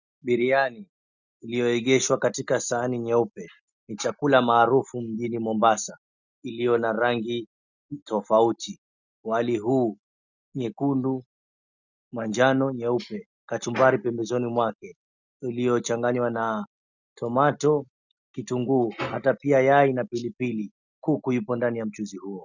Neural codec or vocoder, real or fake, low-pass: none; real; 7.2 kHz